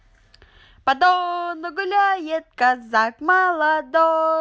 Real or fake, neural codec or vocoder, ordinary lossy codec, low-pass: real; none; none; none